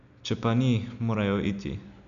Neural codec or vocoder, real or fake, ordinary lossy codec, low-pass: none; real; MP3, 96 kbps; 7.2 kHz